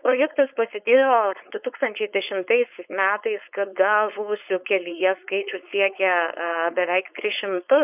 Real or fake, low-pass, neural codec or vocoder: fake; 3.6 kHz; codec, 16 kHz, 4.8 kbps, FACodec